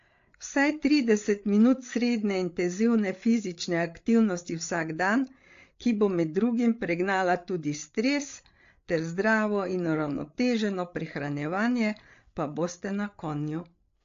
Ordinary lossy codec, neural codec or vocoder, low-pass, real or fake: AAC, 48 kbps; codec, 16 kHz, 8 kbps, FreqCodec, larger model; 7.2 kHz; fake